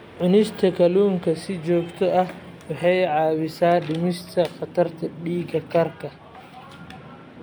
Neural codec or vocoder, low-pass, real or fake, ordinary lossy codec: none; none; real; none